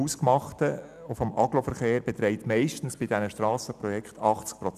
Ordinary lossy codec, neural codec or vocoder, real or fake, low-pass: none; none; real; 14.4 kHz